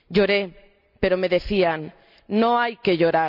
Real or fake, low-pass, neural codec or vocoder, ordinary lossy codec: real; 5.4 kHz; none; none